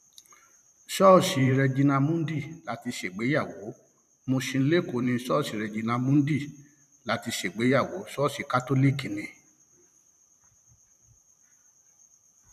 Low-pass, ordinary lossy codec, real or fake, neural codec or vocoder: 14.4 kHz; none; fake; vocoder, 44.1 kHz, 128 mel bands every 512 samples, BigVGAN v2